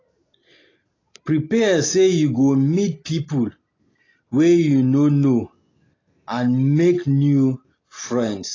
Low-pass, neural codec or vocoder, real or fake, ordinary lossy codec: 7.2 kHz; none; real; AAC, 32 kbps